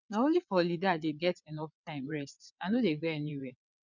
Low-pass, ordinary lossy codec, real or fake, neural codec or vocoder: 7.2 kHz; none; fake; vocoder, 22.05 kHz, 80 mel bands, WaveNeXt